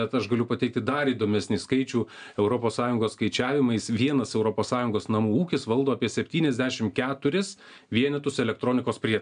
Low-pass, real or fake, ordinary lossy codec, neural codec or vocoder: 9.9 kHz; real; AAC, 96 kbps; none